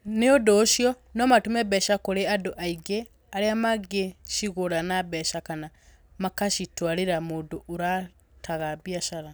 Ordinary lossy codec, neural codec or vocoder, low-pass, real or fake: none; none; none; real